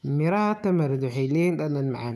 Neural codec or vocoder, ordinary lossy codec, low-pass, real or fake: autoencoder, 48 kHz, 128 numbers a frame, DAC-VAE, trained on Japanese speech; none; 14.4 kHz; fake